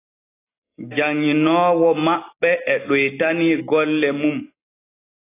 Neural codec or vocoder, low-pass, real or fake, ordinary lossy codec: none; 3.6 kHz; real; AAC, 24 kbps